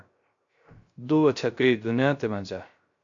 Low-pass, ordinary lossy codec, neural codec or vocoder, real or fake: 7.2 kHz; MP3, 48 kbps; codec, 16 kHz, 0.3 kbps, FocalCodec; fake